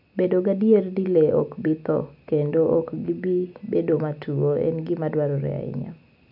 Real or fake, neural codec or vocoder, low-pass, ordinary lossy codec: real; none; 5.4 kHz; none